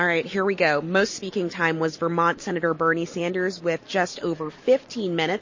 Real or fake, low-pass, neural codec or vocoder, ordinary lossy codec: real; 7.2 kHz; none; MP3, 32 kbps